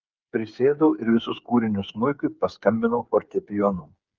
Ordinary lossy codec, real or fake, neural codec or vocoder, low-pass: Opus, 32 kbps; fake; codec, 16 kHz, 8 kbps, FreqCodec, smaller model; 7.2 kHz